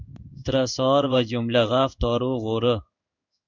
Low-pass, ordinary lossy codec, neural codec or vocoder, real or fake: 7.2 kHz; MP3, 64 kbps; codec, 16 kHz in and 24 kHz out, 1 kbps, XY-Tokenizer; fake